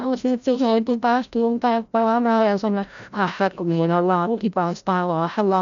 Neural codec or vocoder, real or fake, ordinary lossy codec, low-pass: codec, 16 kHz, 0.5 kbps, FreqCodec, larger model; fake; none; 7.2 kHz